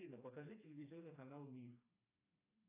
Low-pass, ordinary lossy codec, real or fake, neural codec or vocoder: 3.6 kHz; AAC, 16 kbps; fake; codec, 16 kHz, 2 kbps, FreqCodec, smaller model